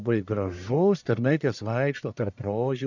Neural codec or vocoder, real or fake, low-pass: codec, 44.1 kHz, 1.7 kbps, Pupu-Codec; fake; 7.2 kHz